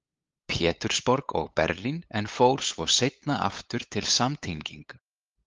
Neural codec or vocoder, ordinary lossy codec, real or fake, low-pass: codec, 16 kHz, 8 kbps, FunCodec, trained on LibriTTS, 25 frames a second; Opus, 32 kbps; fake; 7.2 kHz